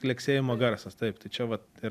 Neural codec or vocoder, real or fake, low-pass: none; real; 14.4 kHz